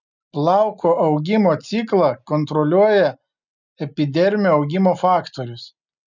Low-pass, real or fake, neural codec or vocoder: 7.2 kHz; real; none